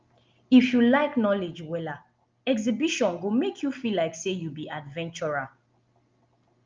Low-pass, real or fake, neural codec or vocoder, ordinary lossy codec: 7.2 kHz; real; none; Opus, 24 kbps